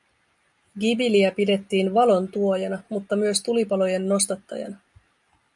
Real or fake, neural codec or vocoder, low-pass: real; none; 10.8 kHz